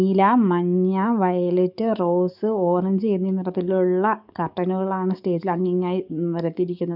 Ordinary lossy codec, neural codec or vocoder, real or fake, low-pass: none; codec, 44.1 kHz, 7.8 kbps, DAC; fake; 5.4 kHz